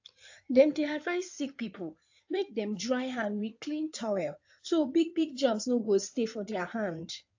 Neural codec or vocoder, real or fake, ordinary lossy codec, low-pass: codec, 16 kHz in and 24 kHz out, 2.2 kbps, FireRedTTS-2 codec; fake; AAC, 48 kbps; 7.2 kHz